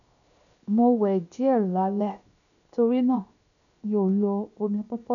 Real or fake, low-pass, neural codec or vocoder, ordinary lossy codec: fake; 7.2 kHz; codec, 16 kHz, 0.7 kbps, FocalCodec; none